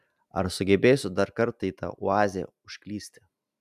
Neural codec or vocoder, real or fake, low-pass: none; real; 14.4 kHz